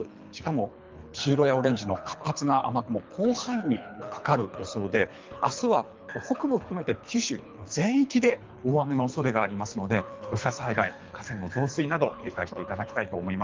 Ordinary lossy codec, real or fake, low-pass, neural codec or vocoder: Opus, 24 kbps; fake; 7.2 kHz; codec, 24 kHz, 3 kbps, HILCodec